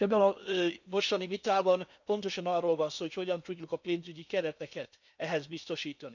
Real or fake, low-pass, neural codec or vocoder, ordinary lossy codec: fake; 7.2 kHz; codec, 16 kHz in and 24 kHz out, 0.8 kbps, FocalCodec, streaming, 65536 codes; none